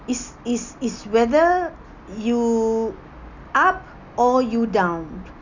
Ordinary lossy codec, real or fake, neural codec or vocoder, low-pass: none; real; none; 7.2 kHz